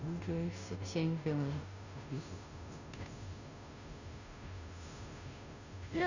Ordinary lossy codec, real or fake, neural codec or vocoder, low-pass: none; fake; codec, 16 kHz, 0.5 kbps, FunCodec, trained on Chinese and English, 25 frames a second; 7.2 kHz